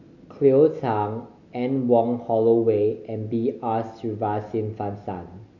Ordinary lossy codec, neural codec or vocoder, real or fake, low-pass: none; none; real; 7.2 kHz